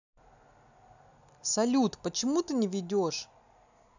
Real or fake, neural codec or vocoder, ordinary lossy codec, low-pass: real; none; none; 7.2 kHz